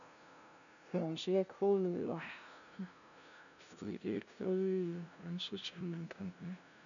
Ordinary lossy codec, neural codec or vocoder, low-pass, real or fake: MP3, 96 kbps; codec, 16 kHz, 0.5 kbps, FunCodec, trained on LibriTTS, 25 frames a second; 7.2 kHz; fake